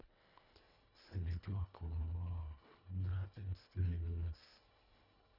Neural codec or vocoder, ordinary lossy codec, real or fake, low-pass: codec, 24 kHz, 1.5 kbps, HILCodec; none; fake; 5.4 kHz